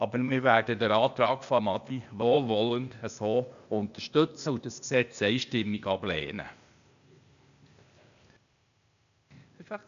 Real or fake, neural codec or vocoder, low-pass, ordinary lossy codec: fake; codec, 16 kHz, 0.8 kbps, ZipCodec; 7.2 kHz; AAC, 96 kbps